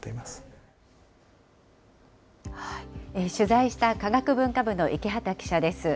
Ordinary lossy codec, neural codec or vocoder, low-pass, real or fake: none; none; none; real